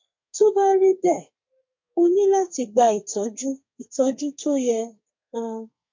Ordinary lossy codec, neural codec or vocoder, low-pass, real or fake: MP3, 48 kbps; codec, 32 kHz, 1.9 kbps, SNAC; 7.2 kHz; fake